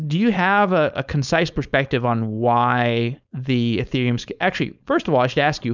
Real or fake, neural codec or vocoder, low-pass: fake; codec, 16 kHz, 4.8 kbps, FACodec; 7.2 kHz